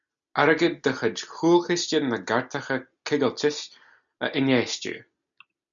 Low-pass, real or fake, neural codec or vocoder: 7.2 kHz; real; none